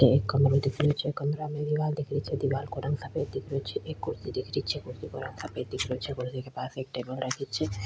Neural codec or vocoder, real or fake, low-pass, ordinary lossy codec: none; real; none; none